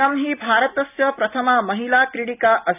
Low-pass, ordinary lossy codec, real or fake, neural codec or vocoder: 3.6 kHz; none; real; none